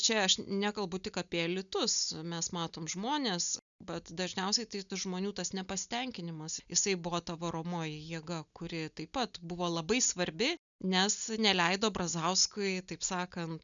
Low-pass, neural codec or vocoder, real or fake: 7.2 kHz; none; real